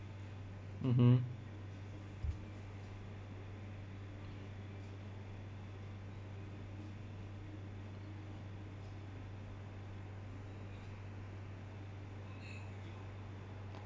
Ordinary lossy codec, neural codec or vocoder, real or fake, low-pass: none; none; real; none